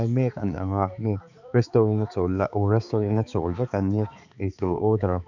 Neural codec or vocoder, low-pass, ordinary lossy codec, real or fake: codec, 16 kHz, 4 kbps, X-Codec, HuBERT features, trained on balanced general audio; 7.2 kHz; none; fake